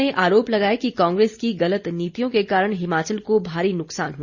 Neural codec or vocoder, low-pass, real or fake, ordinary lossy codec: none; 7.2 kHz; real; Opus, 64 kbps